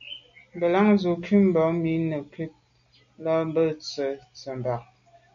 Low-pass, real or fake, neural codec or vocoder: 7.2 kHz; real; none